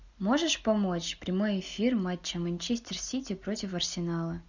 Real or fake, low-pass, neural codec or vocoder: real; 7.2 kHz; none